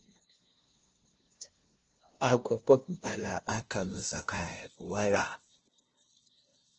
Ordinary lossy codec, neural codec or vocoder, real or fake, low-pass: Opus, 16 kbps; codec, 16 kHz, 0.5 kbps, FunCodec, trained on LibriTTS, 25 frames a second; fake; 7.2 kHz